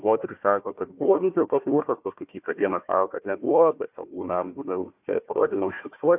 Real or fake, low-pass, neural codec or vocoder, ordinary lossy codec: fake; 3.6 kHz; codec, 16 kHz, 1 kbps, FunCodec, trained on Chinese and English, 50 frames a second; AAC, 32 kbps